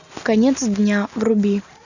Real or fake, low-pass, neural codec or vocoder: real; 7.2 kHz; none